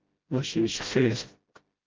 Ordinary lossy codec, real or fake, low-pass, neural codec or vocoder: Opus, 24 kbps; fake; 7.2 kHz; codec, 16 kHz, 0.5 kbps, FreqCodec, smaller model